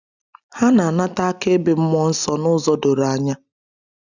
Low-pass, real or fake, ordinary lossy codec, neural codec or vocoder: 7.2 kHz; real; none; none